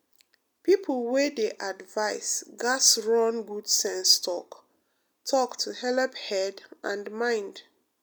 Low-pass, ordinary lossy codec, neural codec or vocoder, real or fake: none; none; none; real